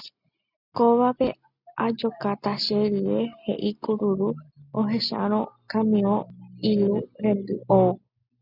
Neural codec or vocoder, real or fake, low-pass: none; real; 5.4 kHz